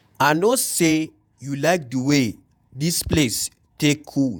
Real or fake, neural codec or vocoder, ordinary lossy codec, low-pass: fake; vocoder, 48 kHz, 128 mel bands, Vocos; none; none